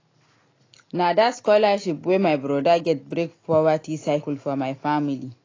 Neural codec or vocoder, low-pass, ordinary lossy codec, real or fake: none; 7.2 kHz; AAC, 32 kbps; real